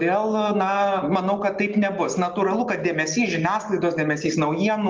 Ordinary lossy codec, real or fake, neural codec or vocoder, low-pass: Opus, 32 kbps; real; none; 7.2 kHz